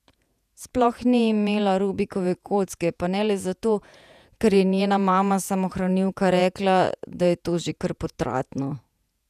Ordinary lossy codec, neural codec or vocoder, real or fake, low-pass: none; vocoder, 48 kHz, 128 mel bands, Vocos; fake; 14.4 kHz